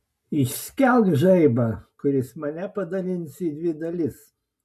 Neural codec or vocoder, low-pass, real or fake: none; 14.4 kHz; real